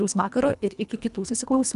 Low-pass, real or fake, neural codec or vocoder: 10.8 kHz; fake; codec, 24 kHz, 1.5 kbps, HILCodec